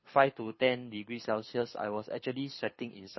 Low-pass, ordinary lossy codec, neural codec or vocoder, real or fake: 7.2 kHz; MP3, 24 kbps; codec, 24 kHz, 6 kbps, HILCodec; fake